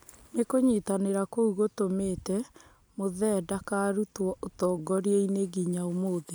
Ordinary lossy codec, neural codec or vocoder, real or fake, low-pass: none; none; real; none